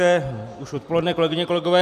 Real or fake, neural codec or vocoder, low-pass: real; none; 14.4 kHz